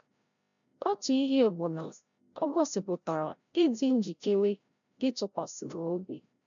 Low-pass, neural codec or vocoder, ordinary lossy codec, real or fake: 7.2 kHz; codec, 16 kHz, 0.5 kbps, FreqCodec, larger model; none; fake